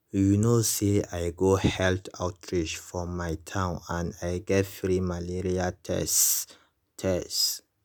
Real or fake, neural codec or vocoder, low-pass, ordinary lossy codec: real; none; none; none